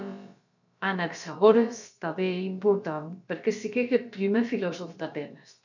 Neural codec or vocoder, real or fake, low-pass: codec, 16 kHz, about 1 kbps, DyCAST, with the encoder's durations; fake; 7.2 kHz